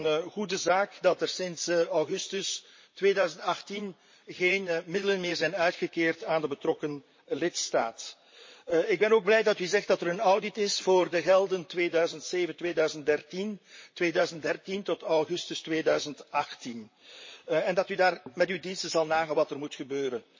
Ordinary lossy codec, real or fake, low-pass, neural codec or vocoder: MP3, 32 kbps; fake; 7.2 kHz; vocoder, 44.1 kHz, 128 mel bands, Pupu-Vocoder